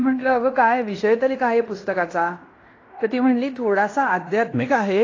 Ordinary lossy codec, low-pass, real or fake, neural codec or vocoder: AAC, 32 kbps; 7.2 kHz; fake; codec, 16 kHz in and 24 kHz out, 0.9 kbps, LongCat-Audio-Codec, fine tuned four codebook decoder